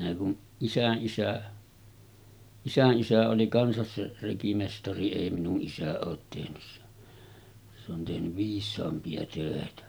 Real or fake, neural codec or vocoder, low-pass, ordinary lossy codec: real; none; none; none